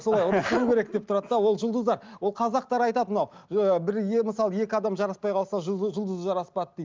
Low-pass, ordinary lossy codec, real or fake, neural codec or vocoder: 7.2 kHz; Opus, 32 kbps; real; none